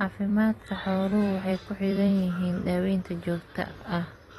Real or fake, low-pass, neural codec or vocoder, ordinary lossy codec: fake; 19.8 kHz; vocoder, 44.1 kHz, 128 mel bands every 256 samples, BigVGAN v2; AAC, 32 kbps